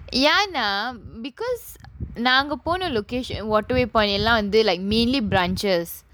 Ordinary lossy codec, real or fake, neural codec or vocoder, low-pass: none; real; none; none